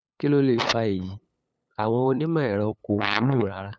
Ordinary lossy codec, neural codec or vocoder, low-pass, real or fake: none; codec, 16 kHz, 8 kbps, FunCodec, trained on LibriTTS, 25 frames a second; none; fake